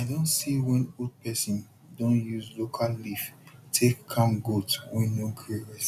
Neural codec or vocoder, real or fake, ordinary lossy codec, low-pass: none; real; none; 14.4 kHz